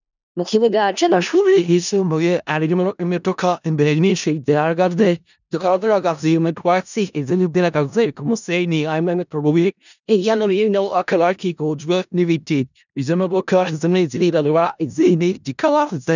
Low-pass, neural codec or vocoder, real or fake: 7.2 kHz; codec, 16 kHz in and 24 kHz out, 0.4 kbps, LongCat-Audio-Codec, four codebook decoder; fake